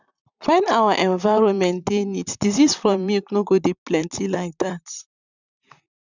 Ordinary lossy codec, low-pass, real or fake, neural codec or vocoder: none; 7.2 kHz; fake; vocoder, 44.1 kHz, 128 mel bands, Pupu-Vocoder